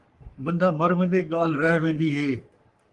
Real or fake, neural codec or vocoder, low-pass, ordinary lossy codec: fake; codec, 44.1 kHz, 3.4 kbps, Pupu-Codec; 10.8 kHz; Opus, 24 kbps